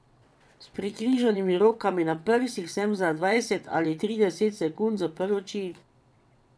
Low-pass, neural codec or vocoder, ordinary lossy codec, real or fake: none; vocoder, 22.05 kHz, 80 mel bands, WaveNeXt; none; fake